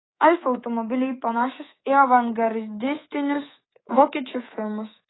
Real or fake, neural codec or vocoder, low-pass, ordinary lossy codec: fake; codec, 24 kHz, 3.1 kbps, DualCodec; 7.2 kHz; AAC, 16 kbps